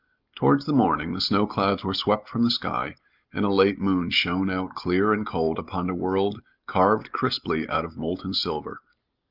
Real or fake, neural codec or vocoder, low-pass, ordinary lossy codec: real; none; 5.4 kHz; Opus, 24 kbps